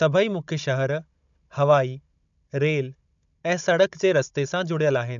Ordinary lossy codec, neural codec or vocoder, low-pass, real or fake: none; codec, 16 kHz, 16 kbps, FunCodec, trained on Chinese and English, 50 frames a second; 7.2 kHz; fake